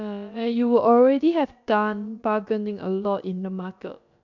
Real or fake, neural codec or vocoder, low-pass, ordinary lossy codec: fake; codec, 16 kHz, about 1 kbps, DyCAST, with the encoder's durations; 7.2 kHz; none